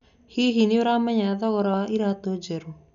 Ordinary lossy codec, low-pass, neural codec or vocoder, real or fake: none; 7.2 kHz; none; real